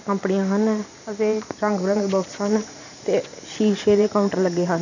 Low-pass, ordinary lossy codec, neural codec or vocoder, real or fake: 7.2 kHz; none; vocoder, 44.1 kHz, 128 mel bands every 512 samples, BigVGAN v2; fake